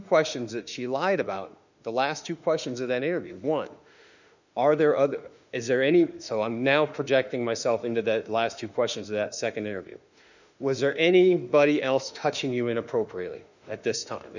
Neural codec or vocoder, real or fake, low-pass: autoencoder, 48 kHz, 32 numbers a frame, DAC-VAE, trained on Japanese speech; fake; 7.2 kHz